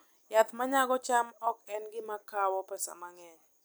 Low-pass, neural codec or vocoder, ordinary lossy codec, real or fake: none; none; none; real